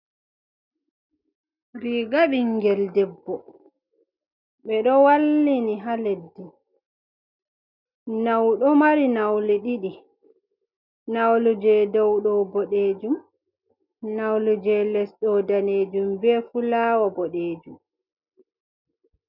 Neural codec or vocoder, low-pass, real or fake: none; 5.4 kHz; real